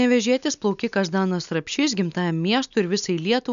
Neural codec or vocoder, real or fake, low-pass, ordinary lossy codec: none; real; 7.2 kHz; MP3, 96 kbps